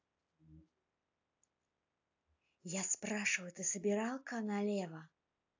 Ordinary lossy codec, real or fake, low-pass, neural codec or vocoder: AAC, 48 kbps; real; 7.2 kHz; none